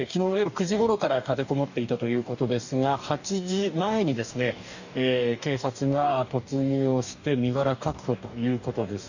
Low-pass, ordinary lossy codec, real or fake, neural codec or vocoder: 7.2 kHz; none; fake; codec, 44.1 kHz, 2.6 kbps, DAC